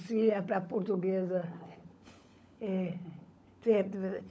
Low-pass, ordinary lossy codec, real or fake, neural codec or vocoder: none; none; fake; codec, 16 kHz, 16 kbps, FunCodec, trained on LibriTTS, 50 frames a second